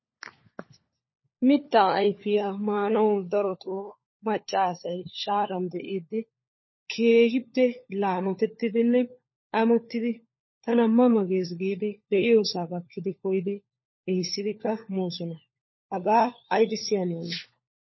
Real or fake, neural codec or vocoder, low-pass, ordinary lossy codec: fake; codec, 16 kHz, 4 kbps, FunCodec, trained on LibriTTS, 50 frames a second; 7.2 kHz; MP3, 24 kbps